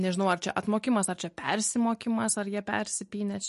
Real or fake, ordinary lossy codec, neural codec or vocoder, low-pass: real; MP3, 48 kbps; none; 14.4 kHz